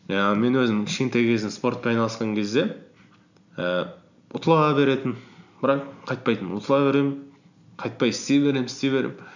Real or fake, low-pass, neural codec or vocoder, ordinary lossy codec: real; 7.2 kHz; none; none